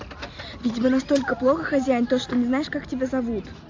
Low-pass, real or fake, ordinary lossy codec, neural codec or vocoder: 7.2 kHz; real; AAC, 48 kbps; none